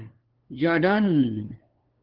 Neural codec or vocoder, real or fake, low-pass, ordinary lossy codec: codec, 16 kHz, 2 kbps, FunCodec, trained on LibriTTS, 25 frames a second; fake; 5.4 kHz; Opus, 16 kbps